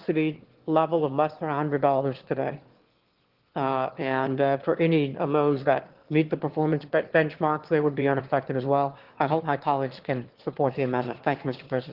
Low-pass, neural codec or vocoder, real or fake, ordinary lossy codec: 5.4 kHz; autoencoder, 22.05 kHz, a latent of 192 numbers a frame, VITS, trained on one speaker; fake; Opus, 16 kbps